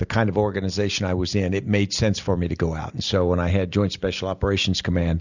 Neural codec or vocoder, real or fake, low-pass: none; real; 7.2 kHz